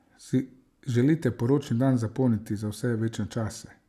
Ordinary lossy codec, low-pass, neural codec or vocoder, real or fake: none; 14.4 kHz; none; real